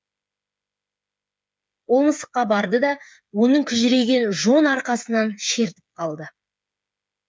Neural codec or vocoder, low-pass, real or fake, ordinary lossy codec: codec, 16 kHz, 8 kbps, FreqCodec, smaller model; none; fake; none